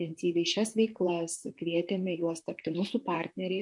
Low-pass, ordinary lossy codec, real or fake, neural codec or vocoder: 10.8 kHz; MP3, 64 kbps; fake; vocoder, 44.1 kHz, 128 mel bands, Pupu-Vocoder